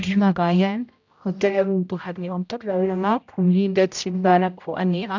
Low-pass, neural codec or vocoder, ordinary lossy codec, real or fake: 7.2 kHz; codec, 16 kHz, 0.5 kbps, X-Codec, HuBERT features, trained on general audio; none; fake